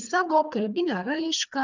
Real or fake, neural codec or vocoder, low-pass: fake; codec, 24 kHz, 3 kbps, HILCodec; 7.2 kHz